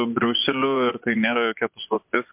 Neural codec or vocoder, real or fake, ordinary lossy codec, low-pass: vocoder, 24 kHz, 100 mel bands, Vocos; fake; MP3, 32 kbps; 3.6 kHz